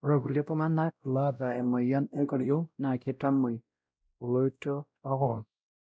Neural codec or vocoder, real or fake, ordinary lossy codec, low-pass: codec, 16 kHz, 0.5 kbps, X-Codec, WavLM features, trained on Multilingual LibriSpeech; fake; none; none